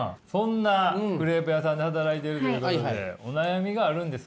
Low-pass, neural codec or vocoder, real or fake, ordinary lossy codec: none; none; real; none